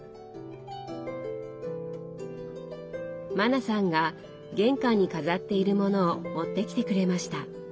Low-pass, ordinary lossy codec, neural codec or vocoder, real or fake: none; none; none; real